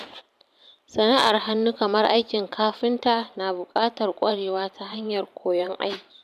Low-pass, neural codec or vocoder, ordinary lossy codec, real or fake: 14.4 kHz; vocoder, 44.1 kHz, 128 mel bands every 512 samples, BigVGAN v2; none; fake